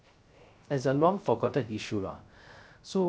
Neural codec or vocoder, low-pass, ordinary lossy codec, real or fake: codec, 16 kHz, 0.3 kbps, FocalCodec; none; none; fake